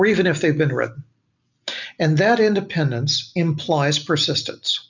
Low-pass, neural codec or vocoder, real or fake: 7.2 kHz; none; real